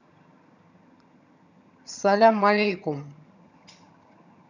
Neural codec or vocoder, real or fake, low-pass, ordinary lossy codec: vocoder, 22.05 kHz, 80 mel bands, HiFi-GAN; fake; 7.2 kHz; none